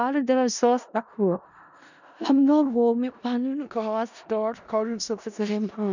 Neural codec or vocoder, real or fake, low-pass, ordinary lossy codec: codec, 16 kHz in and 24 kHz out, 0.4 kbps, LongCat-Audio-Codec, four codebook decoder; fake; 7.2 kHz; none